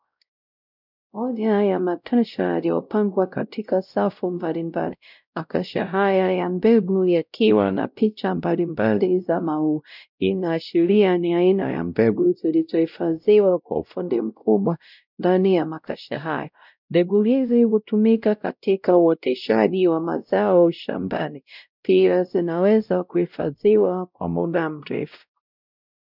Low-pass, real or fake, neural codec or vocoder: 5.4 kHz; fake; codec, 16 kHz, 0.5 kbps, X-Codec, WavLM features, trained on Multilingual LibriSpeech